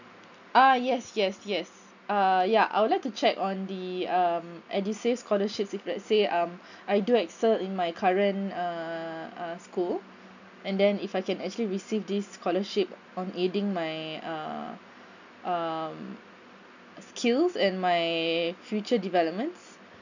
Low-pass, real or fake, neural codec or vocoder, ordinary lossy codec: 7.2 kHz; real; none; none